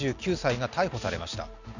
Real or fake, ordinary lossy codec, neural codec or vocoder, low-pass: real; none; none; 7.2 kHz